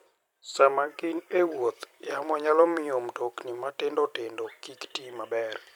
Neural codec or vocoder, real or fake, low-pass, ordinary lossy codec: vocoder, 44.1 kHz, 128 mel bands every 256 samples, BigVGAN v2; fake; 19.8 kHz; none